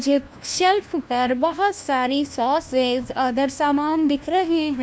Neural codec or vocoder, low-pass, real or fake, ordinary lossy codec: codec, 16 kHz, 1 kbps, FunCodec, trained on LibriTTS, 50 frames a second; none; fake; none